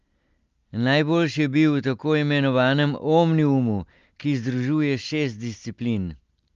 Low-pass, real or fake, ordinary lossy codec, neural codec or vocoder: 7.2 kHz; real; Opus, 32 kbps; none